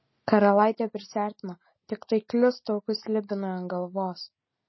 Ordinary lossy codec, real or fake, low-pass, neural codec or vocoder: MP3, 24 kbps; real; 7.2 kHz; none